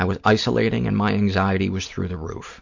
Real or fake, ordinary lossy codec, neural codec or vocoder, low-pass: real; MP3, 48 kbps; none; 7.2 kHz